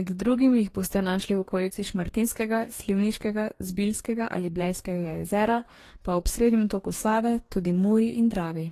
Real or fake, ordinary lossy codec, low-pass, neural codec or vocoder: fake; AAC, 48 kbps; 14.4 kHz; codec, 44.1 kHz, 2.6 kbps, DAC